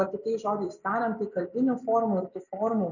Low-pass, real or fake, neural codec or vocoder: 7.2 kHz; real; none